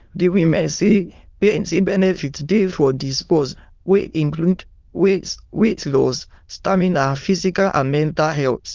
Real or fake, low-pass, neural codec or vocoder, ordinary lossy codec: fake; 7.2 kHz; autoencoder, 22.05 kHz, a latent of 192 numbers a frame, VITS, trained on many speakers; Opus, 24 kbps